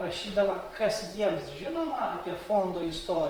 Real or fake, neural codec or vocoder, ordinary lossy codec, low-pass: fake; vocoder, 44.1 kHz, 128 mel bands, Pupu-Vocoder; Opus, 32 kbps; 19.8 kHz